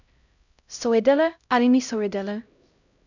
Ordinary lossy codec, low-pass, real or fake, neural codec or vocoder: none; 7.2 kHz; fake; codec, 16 kHz, 0.5 kbps, X-Codec, HuBERT features, trained on LibriSpeech